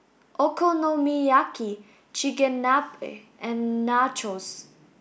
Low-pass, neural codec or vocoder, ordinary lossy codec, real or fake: none; none; none; real